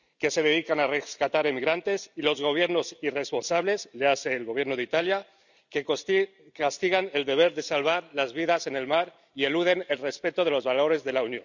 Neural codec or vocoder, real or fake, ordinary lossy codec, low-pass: none; real; none; 7.2 kHz